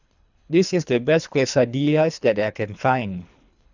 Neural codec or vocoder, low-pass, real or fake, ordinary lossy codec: codec, 24 kHz, 3 kbps, HILCodec; 7.2 kHz; fake; none